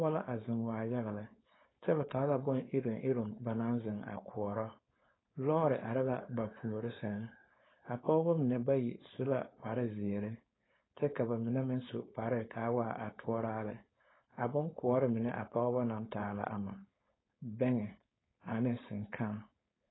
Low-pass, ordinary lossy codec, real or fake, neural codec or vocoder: 7.2 kHz; AAC, 16 kbps; fake; codec, 16 kHz, 4.8 kbps, FACodec